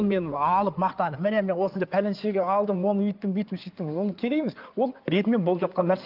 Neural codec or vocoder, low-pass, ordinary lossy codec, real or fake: codec, 16 kHz in and 24 kHz out, 2.2 kbps, FireRedTTS-2 codec; 5.4 kHz; Opus, 24 kbps; fake